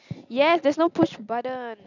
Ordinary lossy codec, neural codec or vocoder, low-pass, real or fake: none; none; 7.2 kHz; real